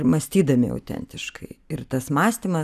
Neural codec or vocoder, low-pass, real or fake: none; 14.4 kHz; real